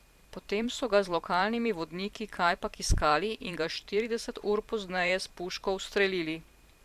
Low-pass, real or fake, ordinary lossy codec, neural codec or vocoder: 14.4 kHz; fake; Opus, 64 kbps; vocoder, 44.1 kHz, 128 mel bands every 512 samples, BigVGAN v2